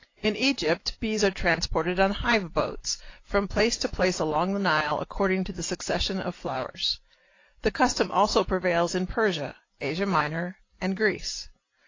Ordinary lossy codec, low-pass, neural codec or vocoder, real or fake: AAC, 32 kbps; 7.2 kHz; none; real